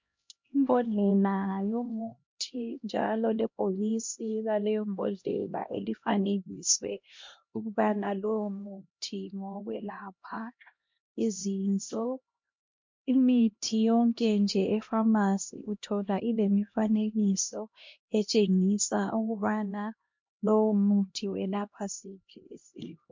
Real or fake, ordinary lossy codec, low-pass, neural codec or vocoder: fake; MP3, 48 kbps; 7.2 kHz; codec, 16 kHz, 1 kbps, X-Codec, HuBERT features, trained on LibriSpeech